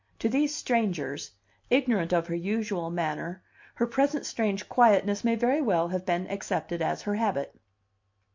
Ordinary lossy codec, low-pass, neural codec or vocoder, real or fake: MP3, 48 kbps; 7.2 kHz; none; real